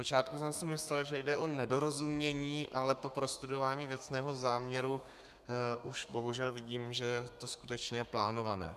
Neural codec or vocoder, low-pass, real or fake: codec, 32 kHz, 1.9 kbps, SNAC; 14.4 kHz; fake